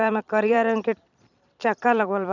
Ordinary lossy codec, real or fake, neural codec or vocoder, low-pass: none; fake; vocoder, 44.1 kHz, 128 mel bands every 256 samples, BigVGAN v2; 7.2 kHz